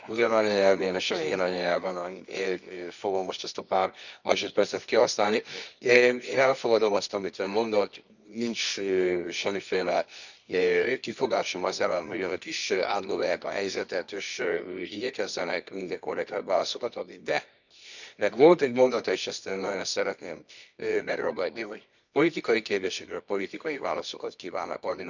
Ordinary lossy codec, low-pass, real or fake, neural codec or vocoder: none; 7.2 kHz; fake; codec, 24 kHz, 0.9 kbps, WavTokenizer, medium music audio release